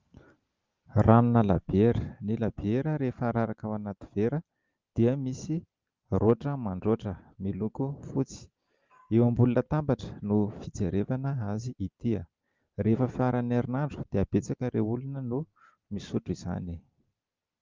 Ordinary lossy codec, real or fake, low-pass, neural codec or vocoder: Opus, 32 kbps; real; 7.2 kHz; none